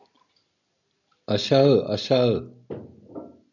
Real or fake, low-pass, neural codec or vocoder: real; 7.2 kHz; none